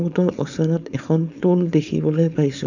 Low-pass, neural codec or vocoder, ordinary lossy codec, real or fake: 7.2 kHz; codec, 16 kHz, 4.8 kbps, FACodec; none; fake